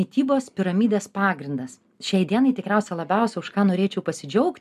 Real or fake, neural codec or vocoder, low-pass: real; none; 14.4 kHz